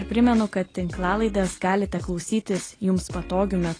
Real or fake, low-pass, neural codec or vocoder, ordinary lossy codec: real; 9.9 kHz; none; AAC, 32 kbps